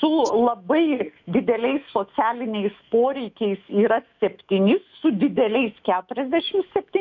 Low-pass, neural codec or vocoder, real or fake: 7.2 kHz; autoencoder, 48 kHz, 128 numbers a frame, DAC-VAE, trained on Japanese speech; fake